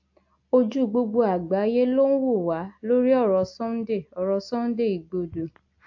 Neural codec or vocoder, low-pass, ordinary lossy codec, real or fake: none; 7.2 kHz; AAC, 48 kbps; real